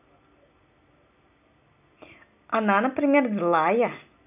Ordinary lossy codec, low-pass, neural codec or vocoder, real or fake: none; 3.6 kHz; none; real